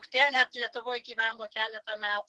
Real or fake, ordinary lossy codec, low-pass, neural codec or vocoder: fake; Opus, 16 kbps; 10.8 kHz; codec, 44.1 kHz, 2.6 kbps, SNAC